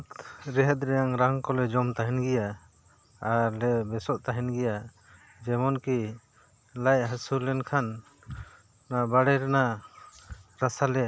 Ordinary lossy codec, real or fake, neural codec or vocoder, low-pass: none; real; none; none